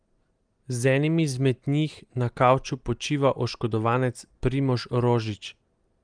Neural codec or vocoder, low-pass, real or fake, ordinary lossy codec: none; 9.9 kHz; real; Opus, 24 kbps